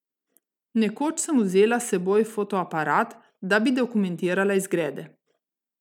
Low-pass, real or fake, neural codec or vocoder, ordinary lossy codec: 19.8 kHz; real; none; none